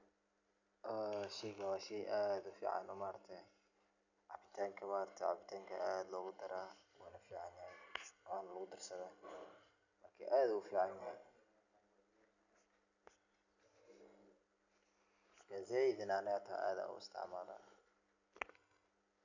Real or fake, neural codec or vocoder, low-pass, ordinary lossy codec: real; none; 7.2 kHz; none